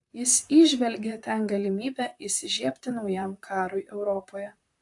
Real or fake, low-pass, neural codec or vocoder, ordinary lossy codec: fake; 10.8 kHz; vocoder, 44.1 kHz, 128 mel bands, Pupu-Vocoder; AAC, 64 kbps